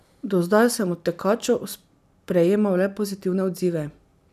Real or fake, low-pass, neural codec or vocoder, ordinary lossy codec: real; 14.4 kHz; none; none